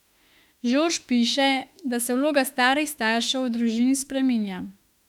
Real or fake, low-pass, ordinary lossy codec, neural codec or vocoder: fake; 19.8 kHz; none; autoencoder, 48 kHz, 32 numbers a frame, DAC-VAE, trained on Japanese speech